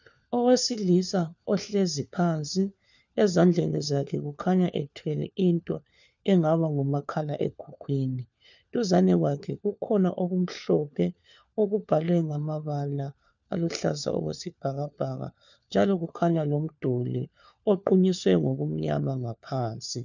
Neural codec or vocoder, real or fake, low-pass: codec, 16 kHz, 4 kbps, FunCodec, trained on LibriTTS, 50 frames a second; fake; 7.2 kHz